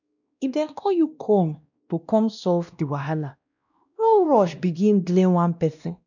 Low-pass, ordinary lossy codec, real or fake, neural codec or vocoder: 7.2 kHz; none; fake; codec, 16 kHz, 1 kbps, X-Codec, WavLM features, trained on Multilingual LibriSpeech